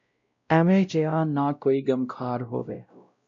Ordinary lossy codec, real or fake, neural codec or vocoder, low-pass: MP3, 48 kbps; fake; codec, 16 kHz, 0.5 kbps, X-Codec, WavLM features, trained on Multilingual LibriSpeech; 7.2 kHz